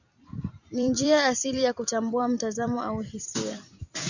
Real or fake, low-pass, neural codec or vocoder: fake; 7.2 kHz; vocoder, 44.1 kHz, 128 mel bands every 256 samples, BigVGAN v2